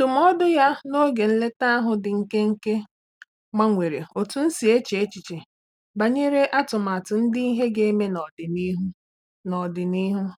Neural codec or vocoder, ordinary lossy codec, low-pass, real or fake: none; none; 19.8 kHz; real